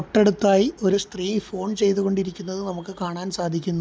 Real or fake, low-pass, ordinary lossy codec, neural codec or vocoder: real; none; none; none